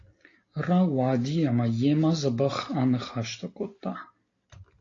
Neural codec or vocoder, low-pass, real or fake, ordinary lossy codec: none; 7.2 kHz; real; AAC, 32 kbps